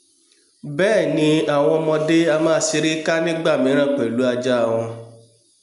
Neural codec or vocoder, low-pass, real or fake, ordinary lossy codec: none; 10.8 kHz; real; none